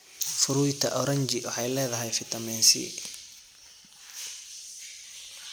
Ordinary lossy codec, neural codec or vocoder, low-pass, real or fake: none; none; none; real